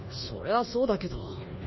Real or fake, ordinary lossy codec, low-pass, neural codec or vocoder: fake; MP3, 24 kbps; 7.2 kHz; codec, 24 kHz, 1.2 kbps, DualCodec